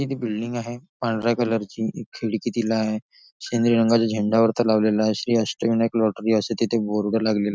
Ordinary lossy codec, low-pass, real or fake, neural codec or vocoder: none; none; real; none